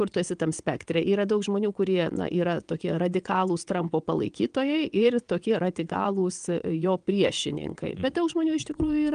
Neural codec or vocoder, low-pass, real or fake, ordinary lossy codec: vocoder, 22.05 kHz, 80 mel bands, WaveNeXt; 9.9 kHz; fake; Opus, 32 kbps